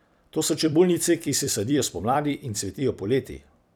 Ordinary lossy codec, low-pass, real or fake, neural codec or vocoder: none; none; fake; vocoder, 44.1 kHz, 128 mel bands every 256 samples, BigVGAN v2